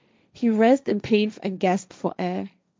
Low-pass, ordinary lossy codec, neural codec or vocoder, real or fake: none; none; codec, 16 kHz, 1.1 kbps, Voila-Tokenizer; fake